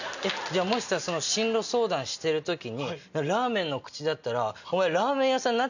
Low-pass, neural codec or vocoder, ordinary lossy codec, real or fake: 7.2 kHz; none; none; real